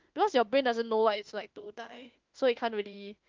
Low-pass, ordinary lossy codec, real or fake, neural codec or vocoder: 7.2 kHz; Opus, 32 kbps; fake; autoencoder, 48 kHz, 32 numbers a frame, DAC-VAE, trained on Japanese speech